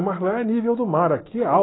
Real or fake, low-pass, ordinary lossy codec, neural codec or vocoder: real; 7.2 kHz; AAC, 16 kbps; none